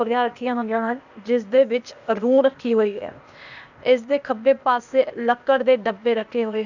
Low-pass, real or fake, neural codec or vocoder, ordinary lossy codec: 7.2 kHz; fake; codec, 16 kHz, 0.8 kbps, ZipCodec; none